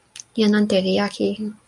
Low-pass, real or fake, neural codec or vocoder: 10.8 kHz; real; none